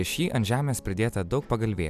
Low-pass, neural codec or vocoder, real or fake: 14.4 kHz; none; real